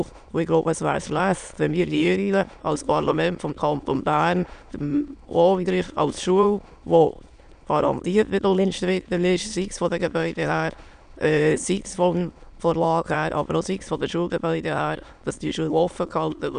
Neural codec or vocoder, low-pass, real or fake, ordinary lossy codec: autoencoder, 22.05 kHz, a latent of 192 numbers a frame, VITS, trained on many speakers; 9.9 kHz; fake; none